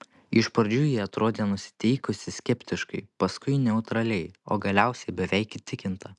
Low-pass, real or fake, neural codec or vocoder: 10.8 kHz; real; none